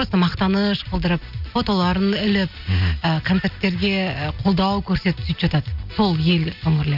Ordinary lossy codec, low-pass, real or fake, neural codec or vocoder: none; 5.4 kHz; real; none